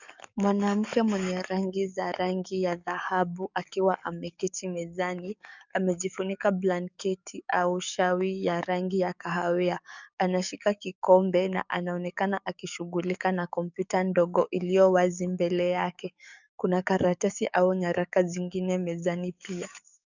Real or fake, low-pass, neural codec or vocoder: fake; 7.2 kHz; codec, 44.1 kHz, 7.8 kbps, DAC